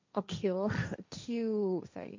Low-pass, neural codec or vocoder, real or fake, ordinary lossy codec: 7.2 kHz; codec, 16 kHz, 1.1 kbps, Voila-Tokenizer; fake; MP3, 64 kbps